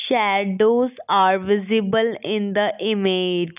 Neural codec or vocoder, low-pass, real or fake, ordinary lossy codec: none; 3.6 kHz; real; none